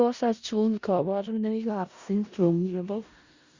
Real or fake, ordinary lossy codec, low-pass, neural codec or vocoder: fake; Opus, 64 kbps; 7.2 kHz; codec, 16 kHz in and 24 kHz out, 0.4 kbps, LongCat-Audio-Codec, four codebook decoder